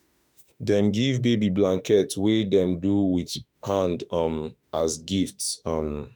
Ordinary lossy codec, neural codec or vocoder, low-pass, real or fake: none; autoencoder, 48 kHz, 32 numbers a frame, DAC-VAE, trained on Japanese speech; none; fake